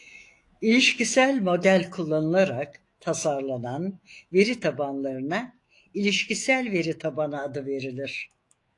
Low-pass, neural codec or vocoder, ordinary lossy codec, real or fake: 10.8 kHz; autoencoder, 48 kHz, 128 numbers a frame, DAC-VAE, trained on Japanese speech; AAC, 48 kbps; fake